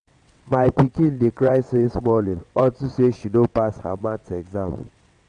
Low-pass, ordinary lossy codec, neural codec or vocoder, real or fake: 9.9 kHz; none; vocoder, 22.05 kHz, 80 mel bands, WaveNeXt; fake